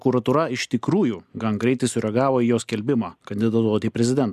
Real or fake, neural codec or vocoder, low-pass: real; none; 14.4 kHz